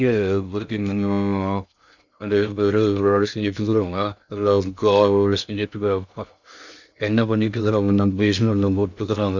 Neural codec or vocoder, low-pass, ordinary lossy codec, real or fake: codec, 16 kHz in and 24 kHz out, 0.6 kbps, FocalCodec, streaming, 2048 codes; 7.2 kHz; none; fake